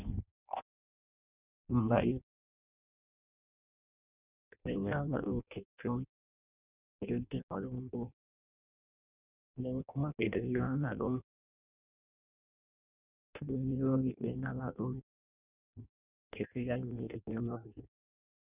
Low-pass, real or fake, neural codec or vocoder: 3.6 kHz; fake; codec, 16 kHz in and 24 kHz out, 0.6 kbps, FireRedTTS-2 codec